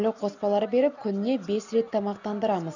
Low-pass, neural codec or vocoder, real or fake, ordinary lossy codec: 7.2 kHz; none; real; none